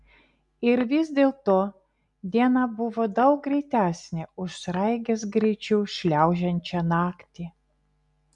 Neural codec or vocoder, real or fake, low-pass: none; real; 10.8 kHz